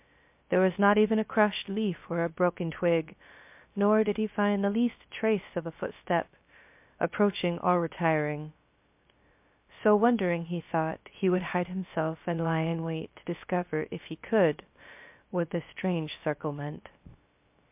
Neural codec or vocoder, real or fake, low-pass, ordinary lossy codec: codec, 16 kHz, 0.3 kbps, FocalCodec; fake; 3.6 kHz; MP3, 32 kbps